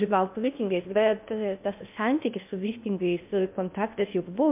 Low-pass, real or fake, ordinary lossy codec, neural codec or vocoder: 3.6 kHz; fake; MP3, 32 kbps; codec, 16 kHz in and 24 kHz out, 0.6 kbps, FocalCodec, streaming, 2048 codes